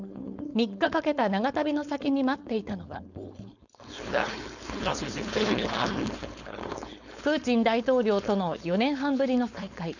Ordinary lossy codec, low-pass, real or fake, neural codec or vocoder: none; 7.2 kHz; fake; codec, 16 kHz, 4.8 kbps, FACodec